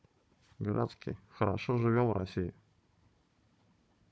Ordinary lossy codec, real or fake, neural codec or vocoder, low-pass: none; fake; codec, 16 kHz, 16 kbps, FunCodec, trained on Chinese and English, 50 frames a second; none